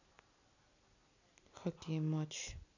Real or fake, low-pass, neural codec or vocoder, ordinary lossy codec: real; 7.2 kHz; none; none